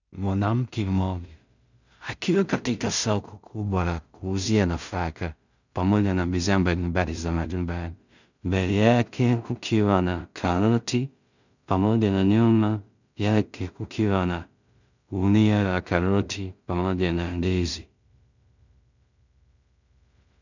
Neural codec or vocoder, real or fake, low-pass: codec, 16 kHz in and 24 kHz out, 0.4 kbps, LongCat-Audio-Codec, two codebook decoder; fake; 7.2 kHz